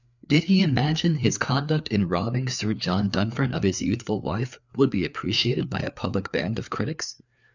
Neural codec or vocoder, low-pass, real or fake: codec, 16 kHz, 4 kbps, FreqCodec, larger model; 7.2 kHz; fake